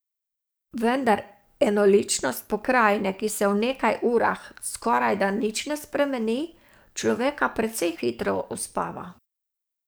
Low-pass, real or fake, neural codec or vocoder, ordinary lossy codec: none; fake; codec, 44.1 kHz, 7.8 kbps, DAC; none